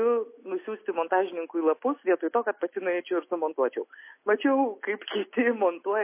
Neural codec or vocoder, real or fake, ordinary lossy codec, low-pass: none; real; MP3, 24 kbps; 3.6 kHz